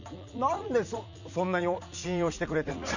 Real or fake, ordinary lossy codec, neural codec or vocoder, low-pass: fake; none; vocoder, 44.1 kHz, 80 mel bands, Vocos; 7.2 kHz